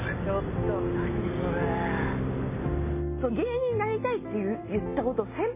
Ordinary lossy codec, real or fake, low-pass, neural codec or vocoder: MP3, 16 kbps; real; 3.6 kHz; none